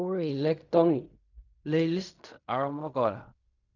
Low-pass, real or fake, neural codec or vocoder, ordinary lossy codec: 7.2 kHz; fake; codec, 16 kHz in and 24 kHz out, 0.4 kbps, LongCat-Audio-Codec, fine tuned four codebook decoder; none